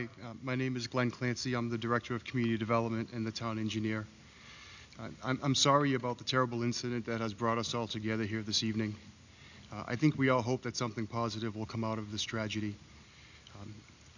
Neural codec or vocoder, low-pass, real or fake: none; 7.2 kHz; real